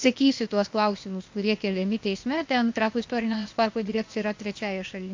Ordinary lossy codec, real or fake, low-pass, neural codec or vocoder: MP3, 48 kbps; fake; 7.2 kHz; codec, 16 kHz, 0.8 kbps, ZipCodec